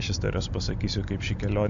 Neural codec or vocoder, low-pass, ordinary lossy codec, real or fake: none; 7.2 kHz; AAC, 64 kbps; real